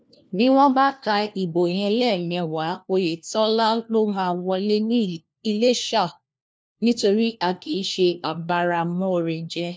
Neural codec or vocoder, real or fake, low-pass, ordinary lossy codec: codec, 16 kHz, 1 kbps, FunCodec, trained on LibriTTS, 50 frames a second; fake; none; none